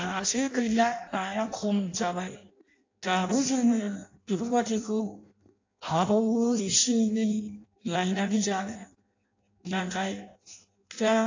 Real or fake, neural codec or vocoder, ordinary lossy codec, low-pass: fake; codec, 16 kHz in and 24 kHz out, 0.6 kbps, FireRedTTS-2 codec; AAC, 48 kbps; 7.2 kHz